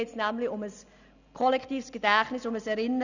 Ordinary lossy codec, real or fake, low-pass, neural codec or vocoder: none; real; 7.2 kHz; none